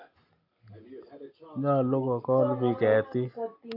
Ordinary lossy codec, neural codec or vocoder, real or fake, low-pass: AAC, 32 kbps; none; real; 5.4 kHz